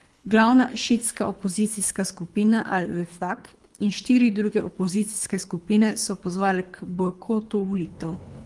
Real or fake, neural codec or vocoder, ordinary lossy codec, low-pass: fake; codec, 24 kHz, 3 kbps, HILCodec; Opus, 32 kbps; 10.8 kHz